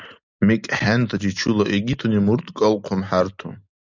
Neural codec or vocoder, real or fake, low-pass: none; real; 7.2 kHz